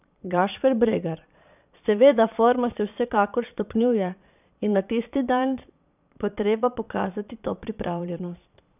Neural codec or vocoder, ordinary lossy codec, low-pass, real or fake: vocoder, 22.05 kHz, 80 mel bands, Vocos; none; 3.6 kHz; fake